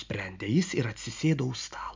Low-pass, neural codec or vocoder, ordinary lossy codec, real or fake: 7.2 kHz; none; MP3, 64 kbps; real